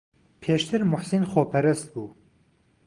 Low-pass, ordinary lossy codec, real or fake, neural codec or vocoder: 9.9 kHz; Opus, 24 kbps; real; none